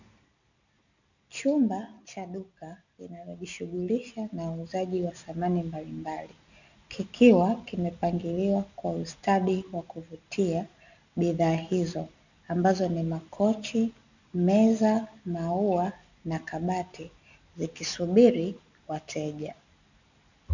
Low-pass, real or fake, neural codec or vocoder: 7.2 kHz; real; none